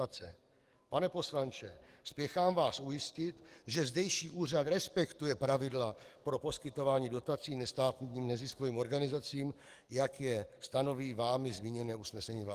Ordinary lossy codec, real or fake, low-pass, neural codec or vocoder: Opus, 24 kbps; fake; 14.4 kHz; codec, 44.1 kHz, 7.8 kbps, DAC